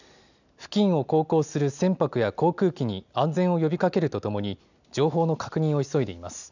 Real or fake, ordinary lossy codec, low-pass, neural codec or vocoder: real; none; 7.2 kHz; none